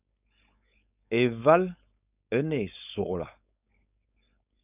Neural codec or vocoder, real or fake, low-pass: codec, 16 kHz, 4.8 kbps, FACodec; fake; 3.6 kHz